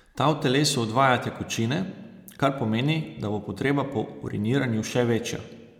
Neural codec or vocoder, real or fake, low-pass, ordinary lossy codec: none; real; 19.8 kHz; MP3, 96 kbps